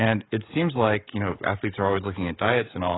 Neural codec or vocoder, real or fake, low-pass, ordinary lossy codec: codec, 16 kHz, 16 kbps, FreqCodec, smaller model; fake; 7.2 kHz; AAC, 16 kbps